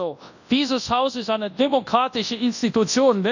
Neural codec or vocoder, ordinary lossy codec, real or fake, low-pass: codec, 24 kHz, 0.9 kbps, WavTokenizer, large speech release; none; fake; 7.2 kHz